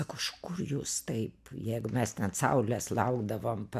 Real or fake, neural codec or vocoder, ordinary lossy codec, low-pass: real; none; MP3, 96 kbps; 14.4 kHz